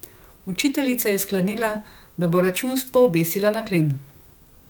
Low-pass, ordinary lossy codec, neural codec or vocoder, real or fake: none; none; codec, 44.1 kHz, 2.6 kbps, SNAC; fake